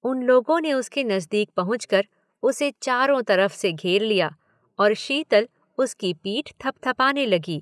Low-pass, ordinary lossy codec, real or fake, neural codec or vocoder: none; none; real; none